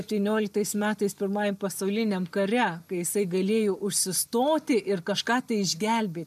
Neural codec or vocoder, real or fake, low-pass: none; real; 14.4 kHz